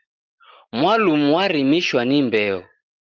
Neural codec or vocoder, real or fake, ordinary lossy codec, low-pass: none; real; Opus, 24 kbps; 7.2 kHz